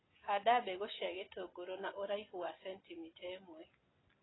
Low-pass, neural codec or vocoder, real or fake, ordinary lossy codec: 7.2 kHz; none; real; AAC, 16 kbps